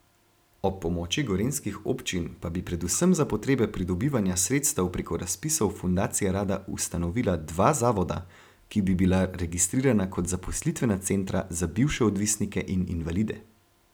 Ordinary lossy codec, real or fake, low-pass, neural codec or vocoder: none; real; none; none